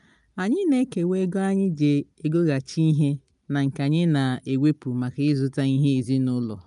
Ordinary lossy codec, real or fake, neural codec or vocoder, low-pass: none; real; none; 10.8 kHz